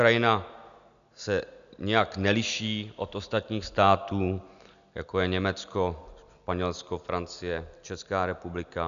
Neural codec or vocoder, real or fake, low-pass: none; real; 7.2 kHz